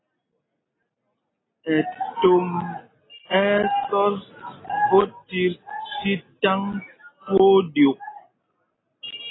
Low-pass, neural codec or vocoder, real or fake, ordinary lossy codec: 7.2 kHz; none; real; AAC, 16 kbps